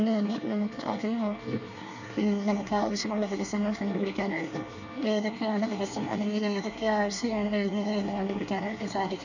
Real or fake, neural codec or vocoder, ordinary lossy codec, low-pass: fake; codec, 24 kHz, 1 kbps, SNAC; none; 7.2 kHz